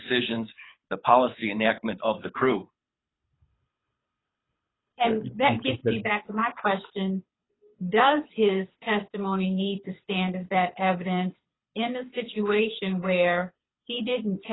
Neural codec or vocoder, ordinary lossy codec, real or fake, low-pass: codec, 24 kHz, 6 kbps, HILCodec; AAC, 16 kbps; fake; 7.2 kHz